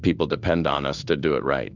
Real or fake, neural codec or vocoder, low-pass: fake; codec, 16 kHz in and 24 kHz out, 1 kbps, XY-Tokenizer; 7.2 kHz